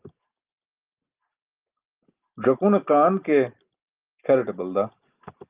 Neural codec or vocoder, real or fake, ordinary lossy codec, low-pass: none; real; Opus, 32 kbps; 3.6 kHz